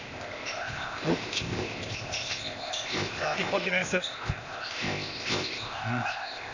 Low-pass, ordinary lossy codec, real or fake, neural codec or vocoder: 7.2 kHz; none; fake; codec, 16 kHz, 0.8 kbps, ZipCodec